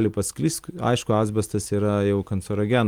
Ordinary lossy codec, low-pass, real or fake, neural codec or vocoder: Opus, 32 kbps; 19.8 kHz; real; none